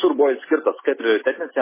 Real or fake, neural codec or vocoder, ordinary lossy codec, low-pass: real; none; MP3, 16 kbps; 3.6 kHz